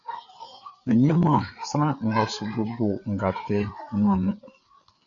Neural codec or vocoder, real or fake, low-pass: codec, 16 kHz, 4 kbps, FreqCodec, larger model; fake; 7.2 kHz